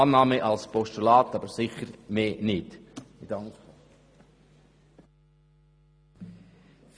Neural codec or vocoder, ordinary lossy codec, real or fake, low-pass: none; none; real; none